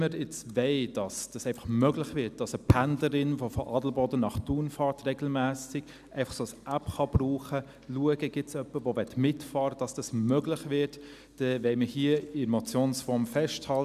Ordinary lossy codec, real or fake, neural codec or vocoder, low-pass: none; real; none; 14.4 kHz